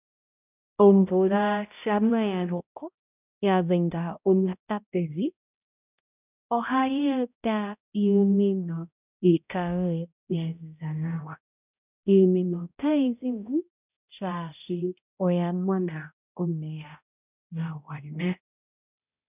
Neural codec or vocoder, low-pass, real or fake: codec, 16 kHz, 0.5 kbps, X-Codec, HuBERT features, trained on balanced general audio; 3.6 kHz; fake